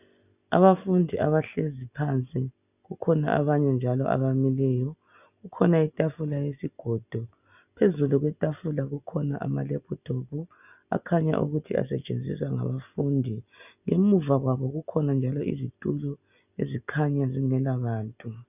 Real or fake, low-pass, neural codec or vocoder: real; 3.6 kHz; none